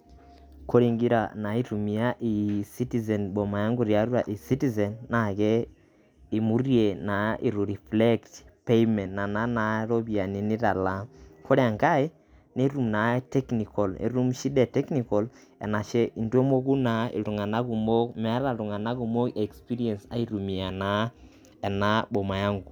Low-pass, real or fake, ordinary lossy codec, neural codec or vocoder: 19.8 kHz; real; none; none